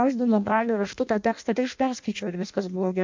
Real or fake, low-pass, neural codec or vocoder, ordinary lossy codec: fake; 7.2 kHz; codec, 16 kHz in and 24 kHz out, 0.6 kbps, FireRedTTS-2 codec; MP3, 64 kbps